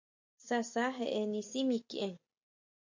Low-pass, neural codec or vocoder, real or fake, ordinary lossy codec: 7.2 kHz; none; real; MP3, 64 kbps